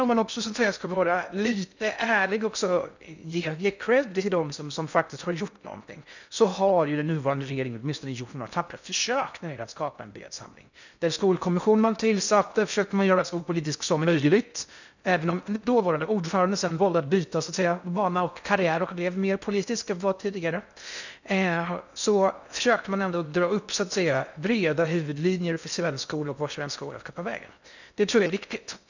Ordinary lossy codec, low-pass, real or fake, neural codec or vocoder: none; 7.2 kHz; fake; codec, 16 kHz in and 24 kHz out, 0.6 kbps, FocalCodec, streaming, 2048 codes